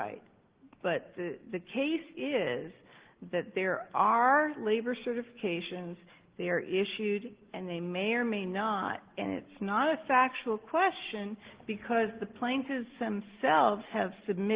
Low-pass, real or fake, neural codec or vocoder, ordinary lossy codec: 3.6 kHz; real; none; Opus, 16 kbps